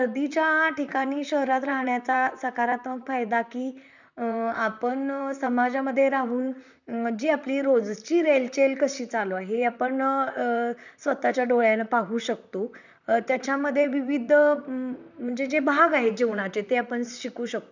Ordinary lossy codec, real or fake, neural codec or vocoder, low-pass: none; fake; vocoder, 44.1 kHz, 128 mel bands, Pupu-Vocoder; 7.2 kHz